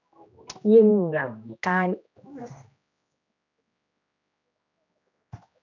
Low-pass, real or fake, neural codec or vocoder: 7.2 kHz; fake; codec, 16 kHz, 1 kbps, X-Codec, HuBERT features, trained on general audio